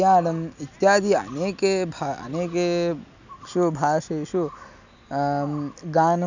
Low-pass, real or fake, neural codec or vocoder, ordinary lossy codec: 7.2 kHz; real; none; none